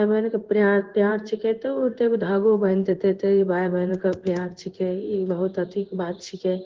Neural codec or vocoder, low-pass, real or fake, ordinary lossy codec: codec, 16 kHz in and 24 kHz out, 1 kbps, XY-Tokenizer; 7.2 kHz; fake; Opus, 16 kbps